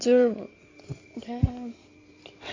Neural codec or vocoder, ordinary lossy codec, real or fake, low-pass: codec, 16 kHz in and 24 kHz out, 2.2 kbps, FireRedTTS-2 codec; AAC, 32 kbps; fake; 7.2 kHz